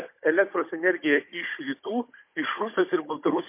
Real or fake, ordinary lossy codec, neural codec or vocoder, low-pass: fake; MP3, 24 kbps; codec, 16 kHz, 16 kbps, FunCodec, trained on Chinese and English, 50 frames a second; 3.6 kHz